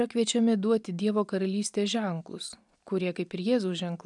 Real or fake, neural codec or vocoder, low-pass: real; none; 10.8 kHz